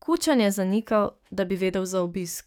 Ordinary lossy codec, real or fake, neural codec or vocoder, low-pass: none; fake; codec, 44.1 kHz, 7.8 kbps, DAC; none